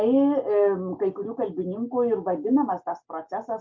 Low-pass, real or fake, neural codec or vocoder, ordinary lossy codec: 7.2 kHz; real; none; MP3, 48 kbps